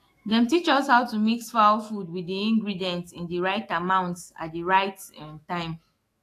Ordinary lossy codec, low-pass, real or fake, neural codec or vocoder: AAC, 48 kbps; 14.4 kHz; fake; autoencoder, 48 kHz, 128 numbers a frame, DAC-VAE, trained on Japanese speech